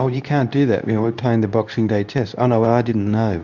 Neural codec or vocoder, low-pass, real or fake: codec, 24 kHz, 0.9 kbps, WavTokenizer, medium speech release version 2; 7.2 kHz; fake